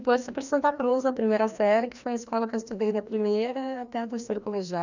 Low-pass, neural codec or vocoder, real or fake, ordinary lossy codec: 7.2 kHz; codec, 16 kHz, 1 kbps, FreqCodec, larger model; fake; none